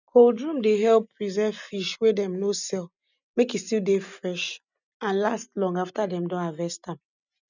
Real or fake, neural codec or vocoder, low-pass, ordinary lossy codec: real; none; 7.2 kHz; none